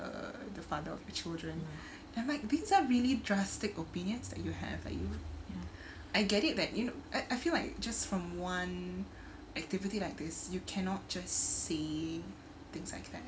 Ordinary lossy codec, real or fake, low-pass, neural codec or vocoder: none; real; none; none